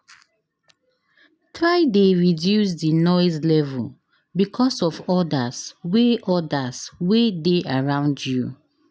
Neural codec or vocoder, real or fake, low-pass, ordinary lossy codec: none; real; none; none